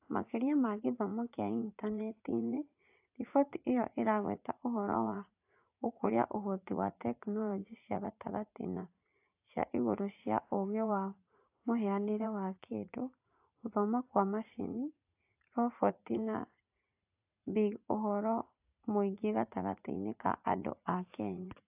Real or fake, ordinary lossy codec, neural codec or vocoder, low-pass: fake; AAC, 32 kbps; vocoder, 44.1 kHz, 80 mel bands, Vocos; 3.6 kHz